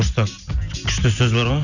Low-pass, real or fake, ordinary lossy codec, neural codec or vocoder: 7.2 kHz; real; none; none